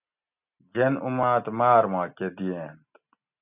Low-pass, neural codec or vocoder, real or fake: 3.6 kHz; none; real